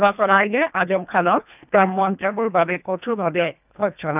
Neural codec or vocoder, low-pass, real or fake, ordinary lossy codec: codec, 24 kHz, 1.5 kbps, HILCodec; 3.6 kHz; fake; none